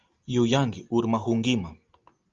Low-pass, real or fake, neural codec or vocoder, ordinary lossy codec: 7.2 kHz; real; none; Opus, 32 kbps